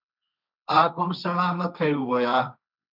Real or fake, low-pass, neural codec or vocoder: fake; 5.4 kHz; codec, 16 kHz, 1.1 kbps, Voila-Tokenizer